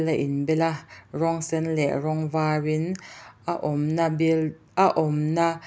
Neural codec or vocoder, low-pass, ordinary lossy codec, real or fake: none; none; none; real